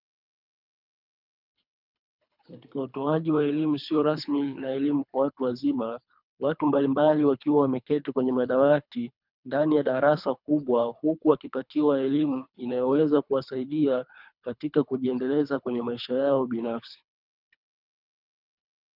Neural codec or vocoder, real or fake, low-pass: codec, 24 kHz, 3 kbps, HILCodec; fake; 5.4 kHz